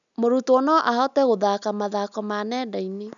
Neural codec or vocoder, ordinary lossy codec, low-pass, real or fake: none; none; 7.2 kHz; real